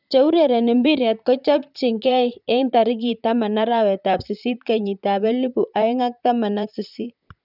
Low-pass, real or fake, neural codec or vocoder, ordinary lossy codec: 5.4 kHz; fake; vocoder, 44.1 kHz, 128 mel bands, Pupu-Vocoder; none